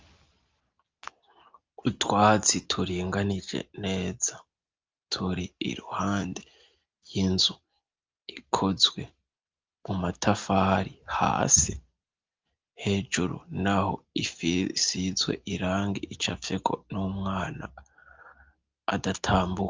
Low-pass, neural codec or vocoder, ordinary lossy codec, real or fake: 7.2 kHz; none; Opus, 32 kbps; real